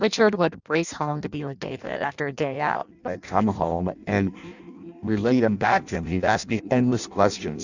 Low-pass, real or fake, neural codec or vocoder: 7.2 kHz; fake; codec, 16 kHz in and 24 kHz out, 0.6 kbps, FireRedTTS-2 codec